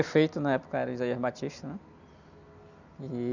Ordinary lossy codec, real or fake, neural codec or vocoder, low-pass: none; real; none; 7.2 kHz